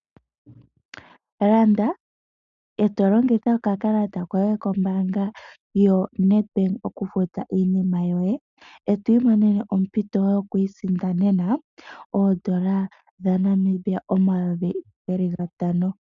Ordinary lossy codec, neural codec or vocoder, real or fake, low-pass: MP3, 96 kbps; none; real; 7.2 kHz